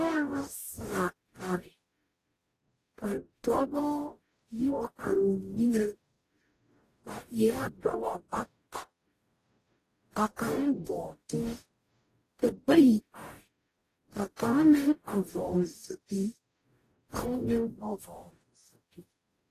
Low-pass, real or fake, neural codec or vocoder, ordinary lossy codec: 14.4 kHz; fake; codec, 44.1 kHz, 0.9 kbps, DAC; AAC, 48 kbps